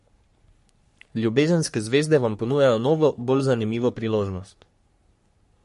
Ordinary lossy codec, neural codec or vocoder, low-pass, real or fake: MP3, 48 kbps; codec, 44.1 kHz, 3.4 kbps, Pupu-Codec; 14.4 kHz; fake